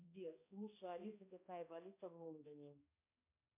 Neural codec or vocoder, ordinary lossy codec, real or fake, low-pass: codec, 16 kHz, 1 kbps, X-Codec, HuBERT features, trained on balanced general audio; MP3, 24 kbps; fake; 3.6 kHz